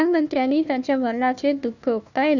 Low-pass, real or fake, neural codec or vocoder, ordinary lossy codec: 7.2 kHz; fake; codec, 16 kHz, 1 kbps, FunCodec, trained on Chinese and English, 50 frames a second; none